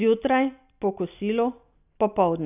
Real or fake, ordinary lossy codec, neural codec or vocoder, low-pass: real; none; none; 3.6 kHz